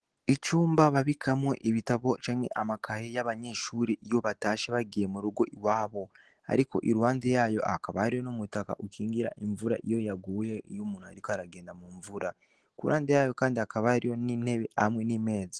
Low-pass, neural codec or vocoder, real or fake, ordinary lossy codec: 10.8 kHz; none; real; Opus, 16 kbps